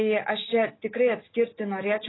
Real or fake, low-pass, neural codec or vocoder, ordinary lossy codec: real; 7.2 kHz; none; AAC, 16 kbps